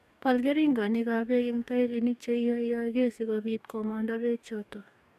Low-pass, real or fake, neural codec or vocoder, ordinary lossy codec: 14.4 kHz; fake; codec, 44.1 kHz, 2.6 kbps, DAC; none